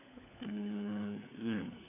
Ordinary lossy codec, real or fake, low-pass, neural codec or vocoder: none; fake; 3.6 kHz; codec, 16 kHz, 4 kbps, FunCodec, trained on LibriTTS, 50 frames a second